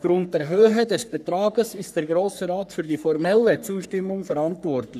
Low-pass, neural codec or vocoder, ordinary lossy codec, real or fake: 14.4 kHz; codec, 44.1 kHz, 3.4 kbps, Pupu-Codec; none; fake